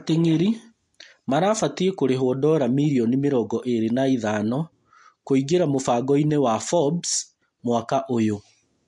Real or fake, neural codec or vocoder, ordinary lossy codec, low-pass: real; none; MP3, 48 kbps; 10.8 kHz